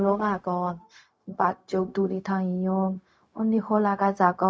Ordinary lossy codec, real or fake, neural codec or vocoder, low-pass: none; fake; codec, 16 kHz, 0.4 kbps, LongCat-Audio-Codec; none